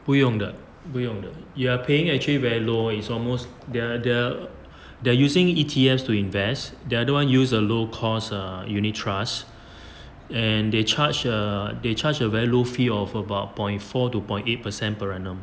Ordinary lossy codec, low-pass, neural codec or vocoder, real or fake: none; none; none; real